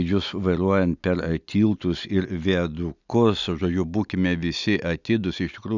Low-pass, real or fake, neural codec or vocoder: 7.2 kHz; real; none